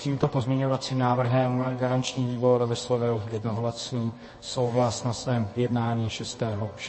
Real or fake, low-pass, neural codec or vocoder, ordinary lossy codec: fake; 9.9 kHz; codec, 24 kHz, 0.9 kbps, WavTokenizer, medium music audio release; MP3, 32 kbps